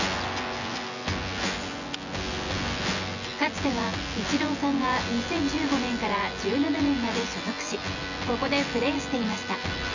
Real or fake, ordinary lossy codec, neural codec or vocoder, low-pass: fake; none; vocoder, 24 kHz, 100 mel bands, Vocos; 7.2 kHz